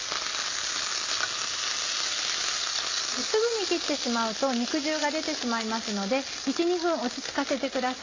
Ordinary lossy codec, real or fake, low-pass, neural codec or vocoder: AAC, 32 kbps; real; 7.2 kHz; none